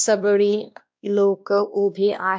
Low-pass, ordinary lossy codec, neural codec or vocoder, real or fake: none; none; codec, 16 kHz, 1 kbps, X-Codec, WavLM features, trained on Multilingual LibriSpeech; fake